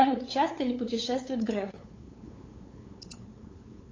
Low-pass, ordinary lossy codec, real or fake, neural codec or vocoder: 7.2 kHz; AAC, 32 kbps; fake; codec, 16 kHz, 8 kbps, FunCodec, trained on LibriTTS, 25 frames a second